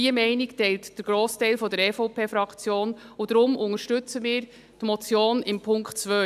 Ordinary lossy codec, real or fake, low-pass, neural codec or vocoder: none; real; 14.4 kHz; none